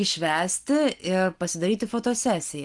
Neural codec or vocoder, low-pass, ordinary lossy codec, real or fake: none; 10.8 kHz; Opus, 24 kbps; real